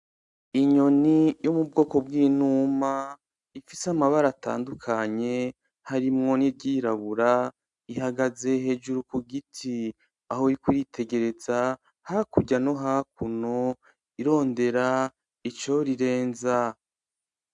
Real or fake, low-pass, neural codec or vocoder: real; 10.8 kHz; none